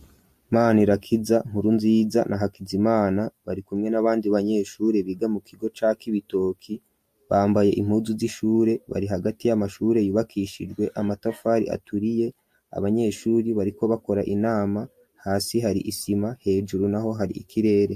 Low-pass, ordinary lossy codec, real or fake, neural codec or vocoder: 14.4 kHz; MP3, 64 kbps; real; none